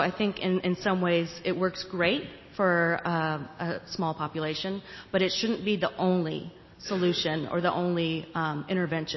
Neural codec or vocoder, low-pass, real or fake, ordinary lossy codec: none; 7.2 kHz; real; MP3, 24 kbps